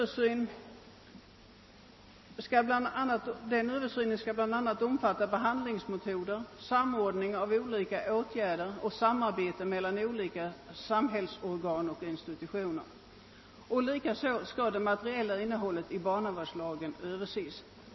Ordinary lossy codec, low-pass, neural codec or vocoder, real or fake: MP3, 24 kbps; 7.2 kHz; none; real